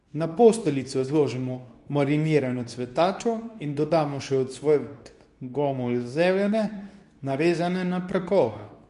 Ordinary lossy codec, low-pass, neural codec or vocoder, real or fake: MP3, 96 kbps; 10.8 kHz; codec, 24 kHz, 0.9 kbps, WavTokenizer, medium speech release version 2; fake